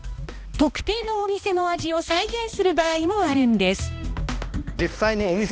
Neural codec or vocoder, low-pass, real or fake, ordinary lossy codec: codec, 16 kHz, 1 kbps, X-Codec, HuBERT features, trained on balanced general audio; none; fake; none